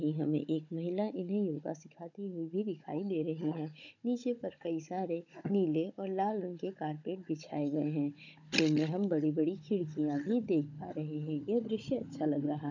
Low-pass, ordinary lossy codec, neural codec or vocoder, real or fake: 7.2 kHz; none; codec, 16 kHz, 16 kbps, FunCodec, trained on Chinese and English, 50 frames a second; fake